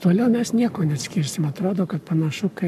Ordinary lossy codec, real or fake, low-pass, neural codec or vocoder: MP3, 96 kbps; fake; 14.4 kHz; codec, 44.1 kHz, 7.8 kbps, Pupu-Codec